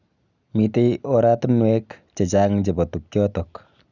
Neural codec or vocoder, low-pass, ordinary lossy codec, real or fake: none; 7.2 kHz; none; real